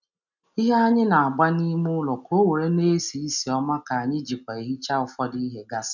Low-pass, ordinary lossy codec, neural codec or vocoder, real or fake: 7.2 kHz; none; none; real